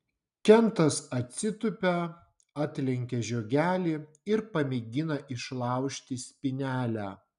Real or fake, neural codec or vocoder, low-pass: real; none; 10.8 kHz